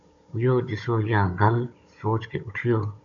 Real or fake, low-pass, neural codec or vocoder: fake; 7.2 kHz; codec, 16 kHz, 16 kbps, FunCodec, trained on Chinese and English, 50 frames a second